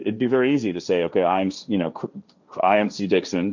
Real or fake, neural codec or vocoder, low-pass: fake; codec, 16 kHz, 1.1 kbps, Voila-Tokenizer; 7.2 kHz